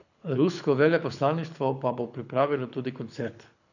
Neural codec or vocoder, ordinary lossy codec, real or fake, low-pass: codec, 24 kHz, 6 kbps, HILCodec; none; fake; 7.2 kHz